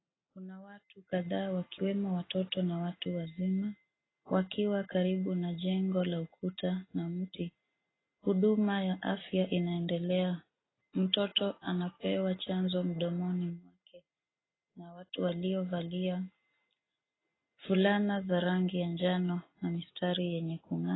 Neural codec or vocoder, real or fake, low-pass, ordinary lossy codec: none; real; 7.2 kHz; AAC, 16 kbps